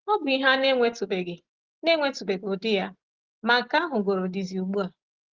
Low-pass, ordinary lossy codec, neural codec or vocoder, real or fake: 7.2 kHz; Opus, 16 kbps; none; real